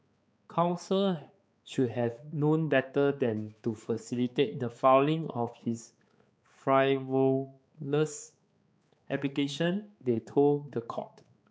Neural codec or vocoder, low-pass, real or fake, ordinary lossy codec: codec, 16 kHz, 4 kbps, X-Codec, HuBERT features, trained on balanced general audio; none; fake; none